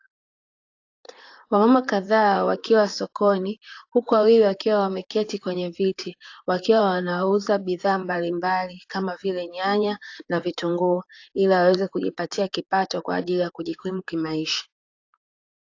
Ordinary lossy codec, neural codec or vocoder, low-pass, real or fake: AAC, 48 kbps; vocoder, 44.1 kHz, 128 mel bands, Pupu-Vocoder; 7.2 kHz; fake